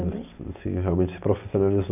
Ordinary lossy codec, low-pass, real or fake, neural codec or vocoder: none; 3.6 kHz; real; none